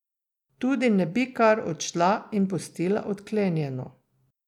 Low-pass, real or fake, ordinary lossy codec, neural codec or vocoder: 19.8 kHz; real; none; none